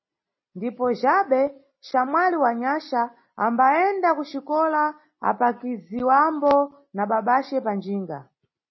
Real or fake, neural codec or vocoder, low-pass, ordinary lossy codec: real; none; 7.2 kHz; MP3, 24 kbps